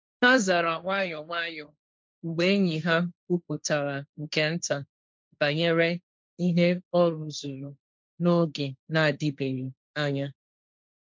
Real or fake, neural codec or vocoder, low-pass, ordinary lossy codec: fake; codec, 16 kHz, 1.1 kbps, Voila-Tokenizer; none; none